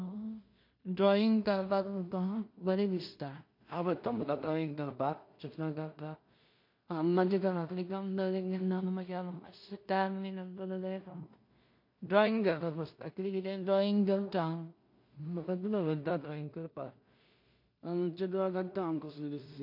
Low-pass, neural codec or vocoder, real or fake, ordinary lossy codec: 5.4 kHz; codec, 16 kHz in and 24 kHz out, 0.4 kbps, LongCat-Audio-Codec, two codebook decoder; fake; MP3, 32 kbps